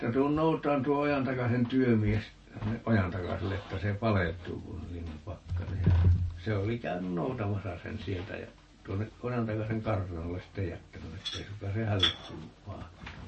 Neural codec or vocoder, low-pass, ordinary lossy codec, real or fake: none; 10.8 kHz; MP3, 32 kbps; real